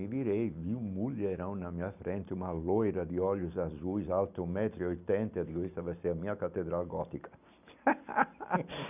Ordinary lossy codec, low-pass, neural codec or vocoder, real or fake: none; 3.6 kHz; none; real